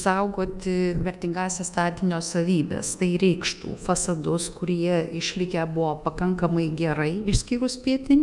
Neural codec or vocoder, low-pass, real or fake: codec, 24 kHz, 1.2 kbps, DualCodec; 10.8 kHz; fake